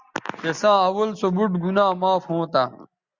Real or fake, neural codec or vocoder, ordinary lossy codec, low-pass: real; none; Opus, 64 kbps; 7.2 kHz